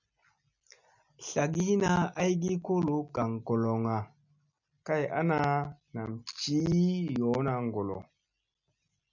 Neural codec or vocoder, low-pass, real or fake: none; 7.2 kHz; real